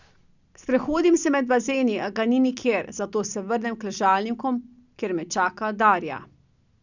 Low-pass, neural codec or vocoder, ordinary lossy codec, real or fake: 7.2 kHz; none; none; real